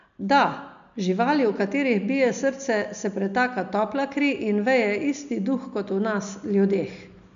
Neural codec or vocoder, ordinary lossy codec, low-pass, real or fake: none; MP3, 64 kbps; 7.2 kHz; real